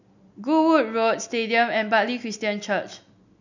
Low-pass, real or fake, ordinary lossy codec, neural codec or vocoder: 7.2 kHz; real; none; none